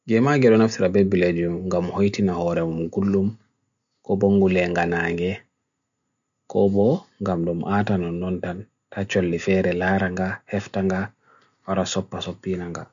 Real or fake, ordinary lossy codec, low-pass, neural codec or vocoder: real; none; 7.2 kHz; none